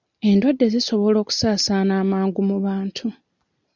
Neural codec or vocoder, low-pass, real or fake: none; 7.2 kHz; real